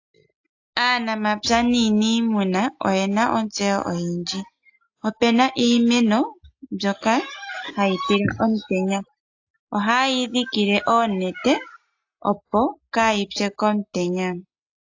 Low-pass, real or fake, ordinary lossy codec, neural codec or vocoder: 7.2 kHz; real; AAC, 48 kbps; none